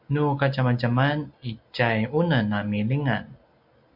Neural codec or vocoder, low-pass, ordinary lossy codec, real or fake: none; 5.4 kHz; MP3, 48 kbps; real